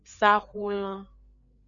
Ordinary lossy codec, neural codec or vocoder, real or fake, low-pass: MP3, 96 kbps; codec, 16 kHz, 4 kbps, FreqCodec, larger model; fake; 7.2 kHz